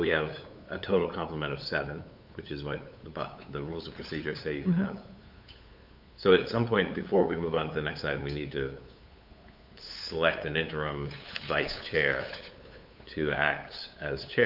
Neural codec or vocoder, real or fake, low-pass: codec, 16 kHz, 8 kbps, FunCodec, trained on LibriTTS, 25 frames a second; fake; 5.4 kHz